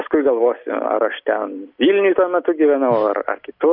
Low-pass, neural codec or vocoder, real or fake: 5.4 kHz; none; real